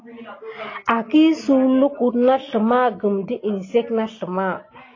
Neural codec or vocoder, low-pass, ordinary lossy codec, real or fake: none; 7.2 kHz; AAC, 32 kbps; real